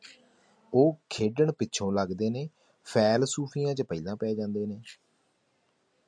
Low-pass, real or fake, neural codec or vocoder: 9.9 kHz; real; none